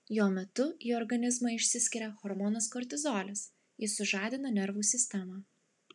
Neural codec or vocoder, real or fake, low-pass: none; real; 10.8 kHz